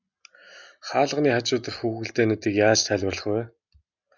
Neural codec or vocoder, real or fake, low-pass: none; real; 7.2 kHz